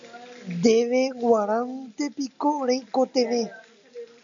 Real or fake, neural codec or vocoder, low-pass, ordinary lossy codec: real; none; 7.2 kHz; AAC, 64 kbps